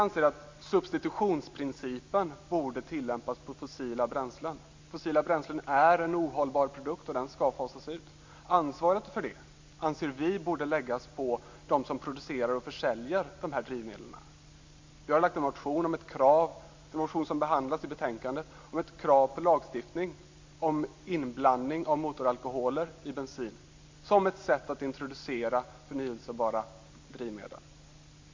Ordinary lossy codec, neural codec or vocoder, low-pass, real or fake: MP3, 64 kbps; none; 7.2 kHz; real